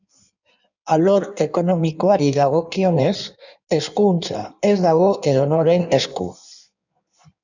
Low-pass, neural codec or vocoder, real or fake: 7.2 kHz; codec, 16 kHz in and 24 kHz out, 1.1 kbps, FireRedTTS-2 codec; fake